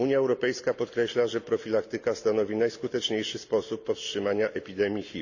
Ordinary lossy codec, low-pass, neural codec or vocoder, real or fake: none; 7.2 kHz; none; real